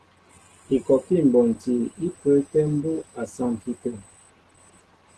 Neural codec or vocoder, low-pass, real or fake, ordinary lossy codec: none; 10.8 kHz; real; Opus, 16 kbps